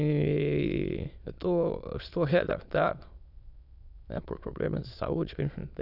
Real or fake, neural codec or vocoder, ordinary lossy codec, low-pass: fake; autoencoder, 22.05 kHz, a latent of 192 numbers a frame, VITS, trained on many speakers; none; 5.4 kHz